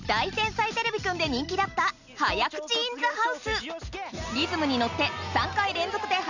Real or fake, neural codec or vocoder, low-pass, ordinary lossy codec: real; none; 7.2 kHz; none